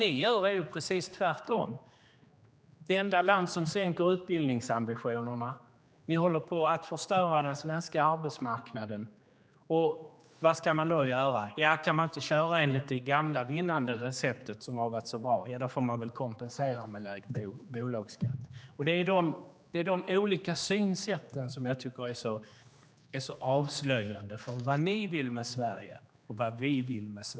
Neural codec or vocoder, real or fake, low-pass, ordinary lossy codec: codec, 16 kHz, 2 kbps, X-Codec, HuBERT features, trained on general audio; fake; none; none